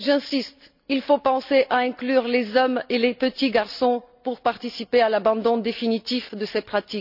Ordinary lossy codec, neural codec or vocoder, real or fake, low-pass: none; none; real; 5.4 kHz